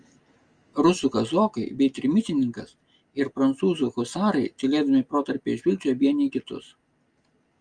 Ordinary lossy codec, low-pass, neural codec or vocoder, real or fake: Opus, 32 kbps; 9.9 kHz; none; real